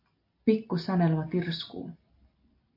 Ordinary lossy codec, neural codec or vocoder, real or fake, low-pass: AAC, 32 kbps; none; real; 5.4 kHz